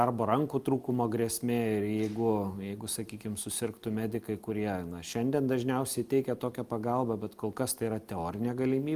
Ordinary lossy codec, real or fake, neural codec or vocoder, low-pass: Opus, 32 kbps; real; none; 14.4 kHz